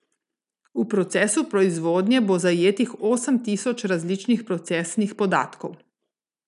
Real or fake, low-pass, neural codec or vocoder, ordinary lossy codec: real; 10.8 kHz; none; none